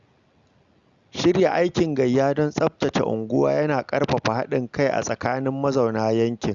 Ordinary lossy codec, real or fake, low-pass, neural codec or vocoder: Opus, 64 kbps; real; 7.2 kHz; none